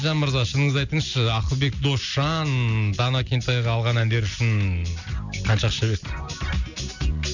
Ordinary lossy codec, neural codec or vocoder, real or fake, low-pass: none; none; real; 7.2 kHz